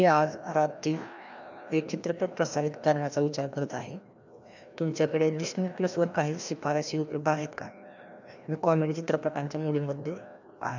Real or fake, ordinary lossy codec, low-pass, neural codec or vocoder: fake; none; 7.2 kHz; codec, 16 kHz, 1 kbps, FreqCodec, larger model